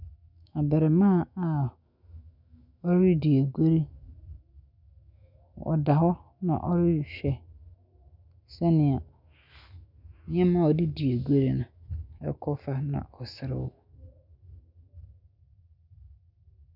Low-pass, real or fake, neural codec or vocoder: 5.4 kHz; fake; autoencoder, 48 kHz, 128 numbers a frame, DAC-VAE, trained on Japanese speech